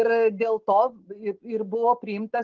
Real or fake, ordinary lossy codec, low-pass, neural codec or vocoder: real; Opus, 16 kbps; 7.2 kHz; none